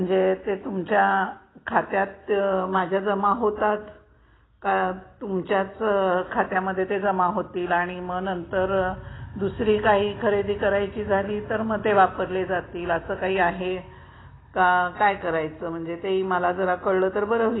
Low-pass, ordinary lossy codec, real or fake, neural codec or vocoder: 7.2 kHz; AAC, 16 kbps; real; none